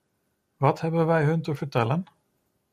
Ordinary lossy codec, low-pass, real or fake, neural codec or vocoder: MP3, 96 kbps; 14.4 kHz; real; none